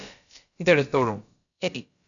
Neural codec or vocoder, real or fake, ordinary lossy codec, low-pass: codec, 16 kHz, about 1 kbps, DyCAST, with the encoder's durations; fake; MP3, 96 kbps; 7.2 kHz